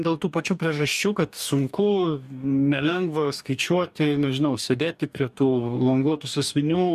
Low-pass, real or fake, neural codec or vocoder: 14.4 kHz; fake; codec, 44.1 kHz, 2.6 kbps, DAC